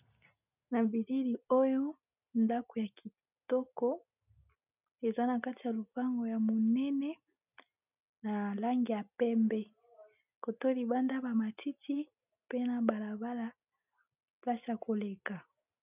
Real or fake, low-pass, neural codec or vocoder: real; 3.6 kHz; none